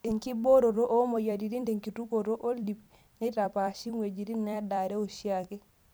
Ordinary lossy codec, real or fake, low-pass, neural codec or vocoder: none; fake; none; vocoder, 44.1 kHz, 128 mel bands every 256 samples, BigVGAN v2